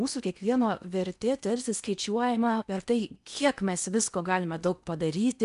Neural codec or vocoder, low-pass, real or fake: codec, 16 kHz in and 24 kHz out, 0.8 kbps, FocalCodec, streaming, 65536 codes; 10.8 kHz; fake